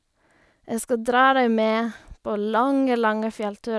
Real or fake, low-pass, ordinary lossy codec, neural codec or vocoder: real; none; none; none